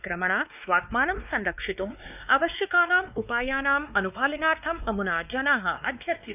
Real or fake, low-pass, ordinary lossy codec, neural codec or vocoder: fake; 3.6 kHz; none; codec, 16 kHz, 2 kbps, X-Codec, WavLM features, trained on Multilingual LibriSpeech